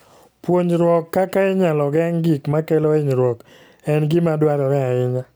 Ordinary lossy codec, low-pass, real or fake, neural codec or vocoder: none; none; real; none